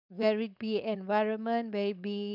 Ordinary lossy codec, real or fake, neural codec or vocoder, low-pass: none; fake; codec, 16 kHz, 4.8 kbps, FACodec; 5.4 kHz